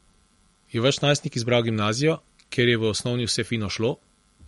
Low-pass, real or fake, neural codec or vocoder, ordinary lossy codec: 19.8 kHz; real; none; MP3, 48 kbps